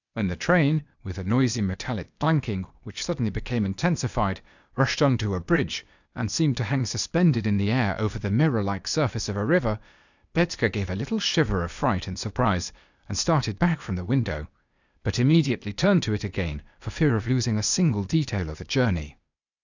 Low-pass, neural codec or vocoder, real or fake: 7.2 kHz; codec, 16 kHz, 0.8 kbps, ZipCodec; fake